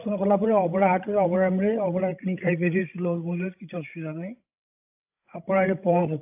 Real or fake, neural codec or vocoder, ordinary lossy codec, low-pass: fake; codec, 16 kHz, 16 kbps, FreqCodec, larger model; AAC, 32 kbps; 3.6 kHz